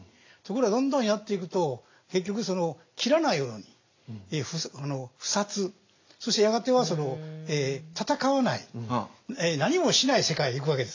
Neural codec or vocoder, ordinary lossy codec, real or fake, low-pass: none; AAC, 48 kbps; real; 7.2 kHz